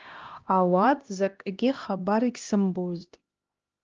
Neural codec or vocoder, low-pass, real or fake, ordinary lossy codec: codec, 16 kHz, 1 kbps, X-Codec, HuBERT features, trained on LibriSpeech; 7.2 kHz; fake; Opus, 24 kbps